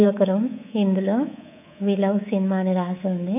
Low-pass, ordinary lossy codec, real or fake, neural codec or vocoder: 3.6 kHz; none; fake; codec, 24 kHz, 3.1 kbps, DualCodec